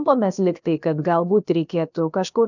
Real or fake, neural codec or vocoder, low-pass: fake; codec, 16 kHz, about 1 kbps, DyCAST, with the encoder's durations; 7.2 kHz